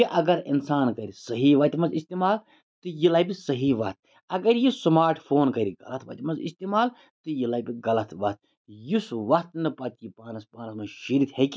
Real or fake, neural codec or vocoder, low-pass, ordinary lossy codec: real; none; none; none